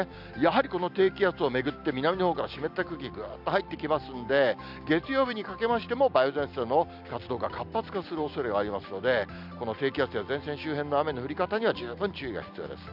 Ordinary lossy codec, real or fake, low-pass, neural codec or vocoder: none; real; 5.4 kHz; none